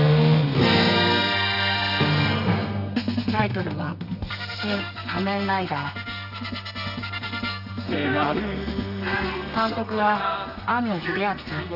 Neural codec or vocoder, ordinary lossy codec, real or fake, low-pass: codec, 32 kHz, 1.9 kbps, SNAC; none; fake; 5.4 kHz